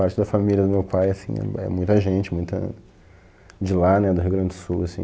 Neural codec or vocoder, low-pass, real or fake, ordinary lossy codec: none; none; real; none